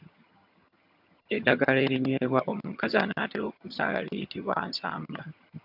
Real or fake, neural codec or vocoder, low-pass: fake; vocoder, 22.05 kHz, 80 mel bands, HiFi-GAN; 5.4 kHz